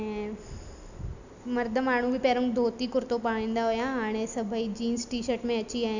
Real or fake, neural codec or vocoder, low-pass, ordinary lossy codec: real; none; 7.2 kHz; none